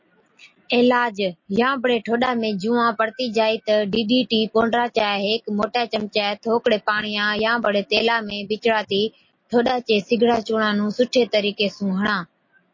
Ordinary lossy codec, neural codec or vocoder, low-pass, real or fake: MP3, 32 kbps; none; 7.2 kHz; real